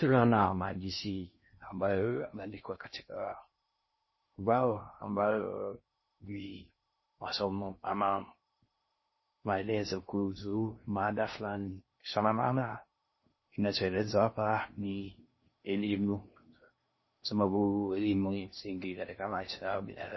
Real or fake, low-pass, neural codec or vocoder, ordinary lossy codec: fake; 7.2 kHz; codec, 16 kHz in and 24 kHz out, 0.6 kbps, FocalCodec, streaming, 2048 codes; MP3, 24 kbps